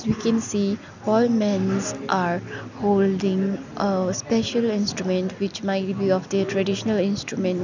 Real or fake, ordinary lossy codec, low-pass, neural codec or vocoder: real; none; 7.2 kHz; none